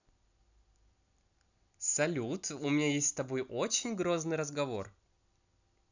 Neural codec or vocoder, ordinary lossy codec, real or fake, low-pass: none; none; real; 7.2 kHz